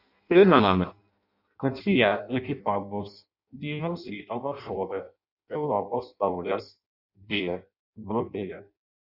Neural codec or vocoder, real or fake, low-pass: codec, 16 kHz in and 24 kHz out, 0.6 kbps, FireRedTTS-2 codec; fake; 5.4 kHz